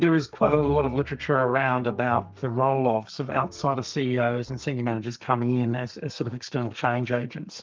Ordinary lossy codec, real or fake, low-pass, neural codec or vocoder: Opus, 24 kbps; fake; 7.2 kHz; codec, 32 kHz, 1.9 kbps, SNAC